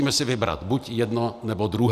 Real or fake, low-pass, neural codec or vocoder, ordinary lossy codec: real; 14.4 kHz; none; AAC, 96 kbps